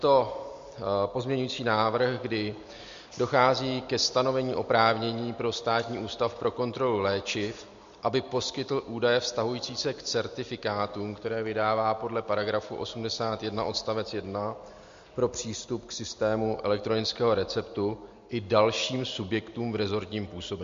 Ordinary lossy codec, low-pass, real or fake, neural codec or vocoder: MP3, 48 kbps; 7.2 kHz; real; none